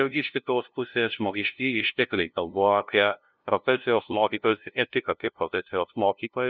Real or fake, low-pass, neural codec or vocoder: fake; 7.2 kHz; codec, 16 kHz, 0.5 kbps, FunCodec, trained on LibriTTS, 25 frames a second